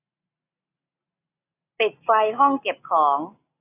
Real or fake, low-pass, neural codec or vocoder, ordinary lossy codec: real; 3.6 kHz; none; AAC, 24 kbps